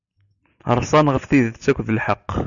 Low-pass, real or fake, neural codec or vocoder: 7.2 kHz; real; none